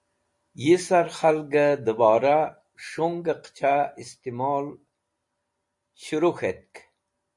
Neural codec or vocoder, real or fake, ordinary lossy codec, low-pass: none; real; MP3, 48 kbps; 10.8 kHz